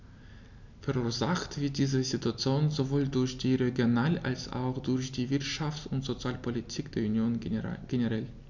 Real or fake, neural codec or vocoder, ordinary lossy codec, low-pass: real; none; none; 7.2 kHz